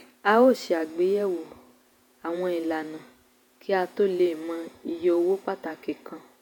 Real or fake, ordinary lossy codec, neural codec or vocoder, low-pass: fake; none; vocoder, 48 kHz, 128 mel bands, Vocos; 19.8 kHz